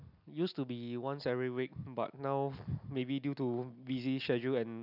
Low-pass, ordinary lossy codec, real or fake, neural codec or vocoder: 5.4 kHz; none; real; none